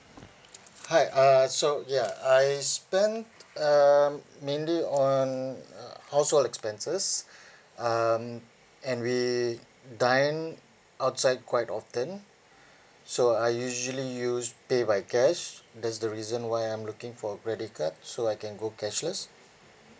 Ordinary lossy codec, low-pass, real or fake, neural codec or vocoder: none; none; real; none